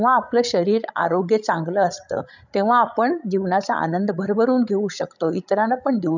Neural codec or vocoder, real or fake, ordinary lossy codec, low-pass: codec, 16 kHz, 16 kbps, FreqCodec, larger model; fake; none; 7.2 kHz